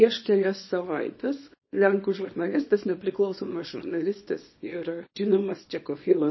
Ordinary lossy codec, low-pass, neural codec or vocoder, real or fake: MP3, 24 kbps; 7.2 kHz; codec, 24 kHz, 0.9 kbps, WavTokenizer, small release; fake